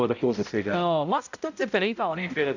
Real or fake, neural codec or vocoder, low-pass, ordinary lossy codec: fake; codec, 16 kHz, 0.5 kbps, X-Codec, HuBERT features, trained on balanced general audio; 7.2 kHz; none